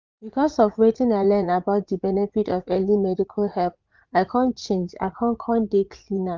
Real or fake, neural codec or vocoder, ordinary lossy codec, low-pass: fake; vocoder, 44.1 kHz, 80 mel bands, Vocos; Opus, 24 kbps; 7.2 kHz